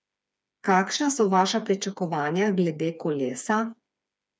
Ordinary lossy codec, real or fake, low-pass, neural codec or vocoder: none; fake; none; codec, 16 kHz, 4 kbps, FreqCodec, smaller model